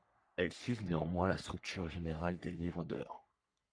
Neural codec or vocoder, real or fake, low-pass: codec, 44.1 kHz, 3.4 kbps, Pupu-Codec; fake; 9.9 kHz